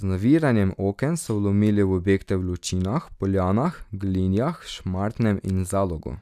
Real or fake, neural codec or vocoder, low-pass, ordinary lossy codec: real; none; 14.4 kHz; none